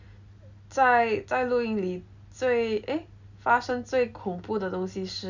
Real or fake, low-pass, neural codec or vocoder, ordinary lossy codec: real; 7.2 kHz; none; none